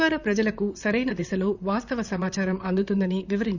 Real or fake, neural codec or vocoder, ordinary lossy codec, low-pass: fake; vocoder, 44.1 kHz, 128 mel bands, Pupu-Vocoder; none; 7.2 kHz